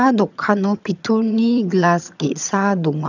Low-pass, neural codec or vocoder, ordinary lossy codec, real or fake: 7.2 kHz; vocoder, 22.05 kHz, 80 mel bands, HiFi-GAN; none; fake